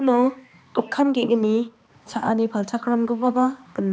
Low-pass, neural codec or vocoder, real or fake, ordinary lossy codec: none; codec, 16 kHz, 2 kbps, X-Codec, HuBERT features, trained on balanced general audio; fake; none